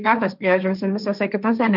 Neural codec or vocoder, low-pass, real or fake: codec, 16 kHz, 1.1 kbps, Voila-Tokenizer; 5.4 kHz; fake